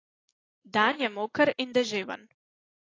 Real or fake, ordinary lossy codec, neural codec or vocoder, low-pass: real; AAC, 32 kbps; none; 7.2 kHz